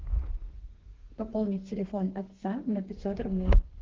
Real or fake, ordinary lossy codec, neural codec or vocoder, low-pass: fake; Opus, 16 kbps; codec, 32 kHz, 1.9 kbps, SNAC; 7.2 kHz